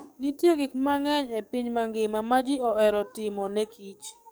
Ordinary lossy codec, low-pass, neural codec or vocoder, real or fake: none; none; codec, 44.1 kHz, 7.8 kbps, DAC; fake